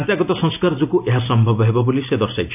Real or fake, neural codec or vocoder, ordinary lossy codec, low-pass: real; none; none; 3.6 kHz